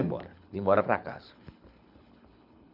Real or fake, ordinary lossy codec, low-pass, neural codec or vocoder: fake; none; 5.4 kHz; codec, 24 kHz, 6 kbps, HILCodec